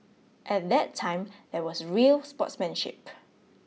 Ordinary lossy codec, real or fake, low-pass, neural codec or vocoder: none; real; none; none